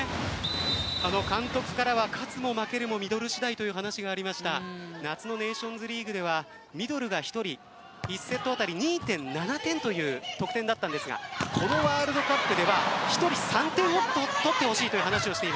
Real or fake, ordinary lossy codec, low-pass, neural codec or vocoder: real; none; none; none